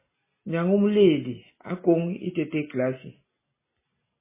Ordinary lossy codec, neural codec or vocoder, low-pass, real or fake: MP3, 16 kbps; none; 3.6 kHz; real